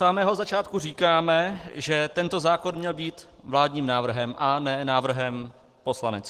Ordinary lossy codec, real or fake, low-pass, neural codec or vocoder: Opus, 16 kbps; real; 14.4 kHz; none